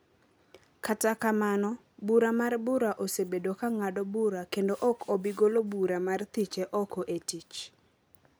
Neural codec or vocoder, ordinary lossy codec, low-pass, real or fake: none; none; none; real